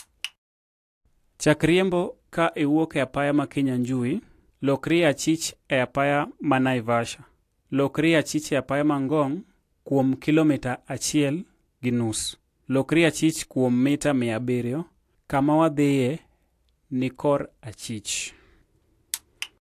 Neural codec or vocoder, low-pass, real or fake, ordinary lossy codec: none; 14.4 kHz; real; AAC, 64 kbps